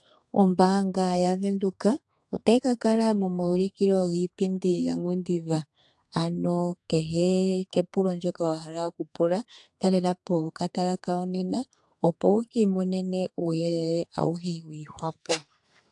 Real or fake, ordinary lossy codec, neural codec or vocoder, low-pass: fake; AAC, 64 kbps; codec, 32 kHz, 1.9 kbps, SNAC; 10.8 kHz